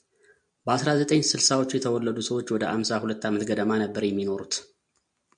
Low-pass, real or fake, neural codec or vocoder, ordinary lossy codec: 9.9 kHz; real; none; MP3, 64 kbps